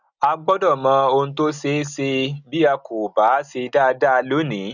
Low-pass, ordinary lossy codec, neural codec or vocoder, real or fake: 7.2 kHz; none; none; real